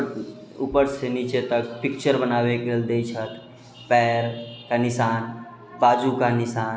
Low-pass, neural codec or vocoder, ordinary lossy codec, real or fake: none; none; none; real